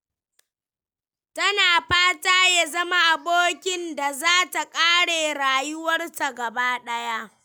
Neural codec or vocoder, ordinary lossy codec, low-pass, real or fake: none; none; none; real